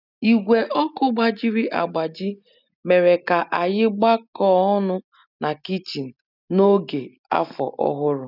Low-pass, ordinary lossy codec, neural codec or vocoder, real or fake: 5.4 kHz; none; none; real